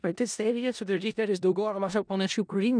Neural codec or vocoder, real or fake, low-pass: codec, 16 kHz in and 24 kHz out, 0.4 kbps, LongCat-Audio-Codec, four codebook decoder; fake; 9.9 kHz